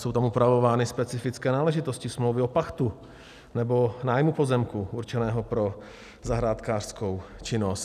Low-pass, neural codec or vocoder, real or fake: 14.4 kHz; none; real